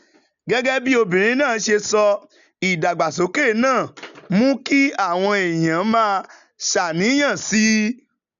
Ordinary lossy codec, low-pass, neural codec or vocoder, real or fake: none; 7.2 kHz; none; real